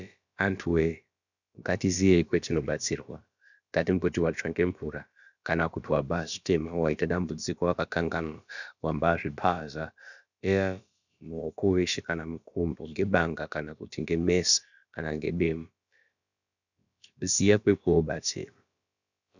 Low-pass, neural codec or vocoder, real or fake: 7.2 kHz; codec, 16 kHz, about 1 kbps, DyCAST, with the encoder's durations; fake